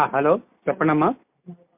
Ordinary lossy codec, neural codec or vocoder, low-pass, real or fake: MP3, 32 kbps; none; 3.6 kHz; real